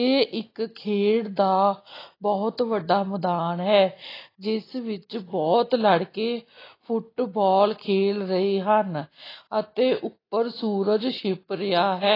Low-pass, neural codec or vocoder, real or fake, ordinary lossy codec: 5.4 kHz; none; real; AAC, 24 kbps